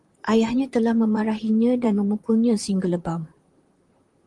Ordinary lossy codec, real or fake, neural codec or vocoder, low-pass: Opus, 24 kbps; fake; vocoder, 44.1 kHz, 128 mel bands, Pupu-Vocoder; 10.8 kHz